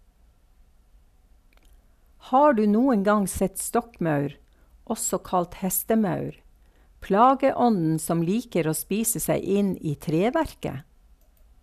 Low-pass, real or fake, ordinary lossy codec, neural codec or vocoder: 14.4 kHz; real; none; none